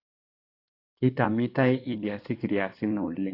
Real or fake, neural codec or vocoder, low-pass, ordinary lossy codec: fake; codec, 16 kHz in and 24 kHz out, 2.2 kbps, FireRedTTS-2 codec; 5.4 kHz; AAC, 32 kbps